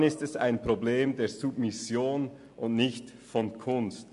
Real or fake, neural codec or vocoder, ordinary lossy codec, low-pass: real; none; MP3, 64 kbps; 10.8 kHz